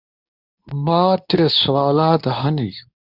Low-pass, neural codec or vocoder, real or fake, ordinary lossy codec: 5.4 kHz; codec, 24 kHz, 0.9 kbps, WavTokenizer, medium speech release version 2; fake; Opus, 64 kbps